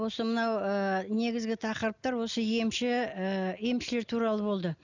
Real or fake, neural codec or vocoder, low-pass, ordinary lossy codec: real; none; 7.2 kHz; none